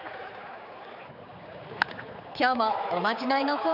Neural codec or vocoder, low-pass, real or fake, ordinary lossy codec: codec, 16 kHz, 4 kbps, X-Codec, HuBERT features, trained on general audio; 5.4 kHz; fake; none